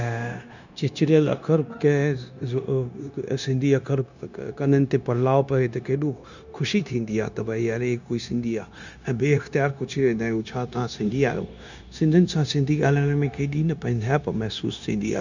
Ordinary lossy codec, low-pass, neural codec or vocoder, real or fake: none; 7.2 kHz; codec, 16 kHz, 0.9 kbps, LongCat-Audio-Codec; fake